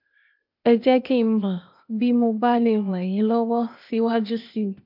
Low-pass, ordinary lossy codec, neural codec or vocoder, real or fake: 5.4 kHz; MP3, 48 kbps; codec, 16 kHz, 0.8 kbps, ZipCodec; fake